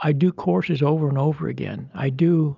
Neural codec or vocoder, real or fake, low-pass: none; real; 7.2 kHz